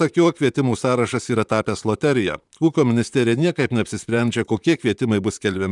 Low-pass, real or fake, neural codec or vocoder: 10.8 kHz; fake; vocoder, 44.1 kHz, 128 mel bands, Pupu-Vocoder